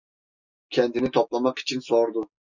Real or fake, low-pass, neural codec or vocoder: real; 7.2 kHz; none